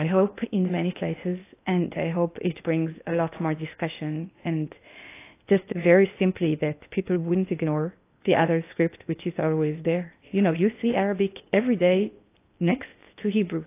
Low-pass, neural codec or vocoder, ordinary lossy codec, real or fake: 3.6 kHz; codec, 16 kHz in and 24 kHz out, 0.8 kbps, FocalCodec, streaming, 65536 codes; AAC, 24 kbps; fake